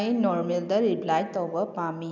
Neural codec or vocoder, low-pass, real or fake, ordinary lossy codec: none; 7.2 kHz; real; none